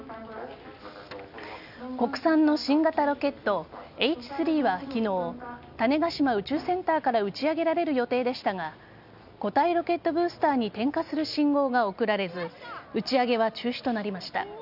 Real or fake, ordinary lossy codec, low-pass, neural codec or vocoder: real; none; 5.4 kHz; none